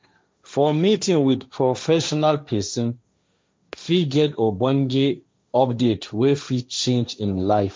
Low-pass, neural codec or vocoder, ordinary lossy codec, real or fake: none; codec, 16 kHz, 1.1 kbps, Voila-Tokenizer; none; fake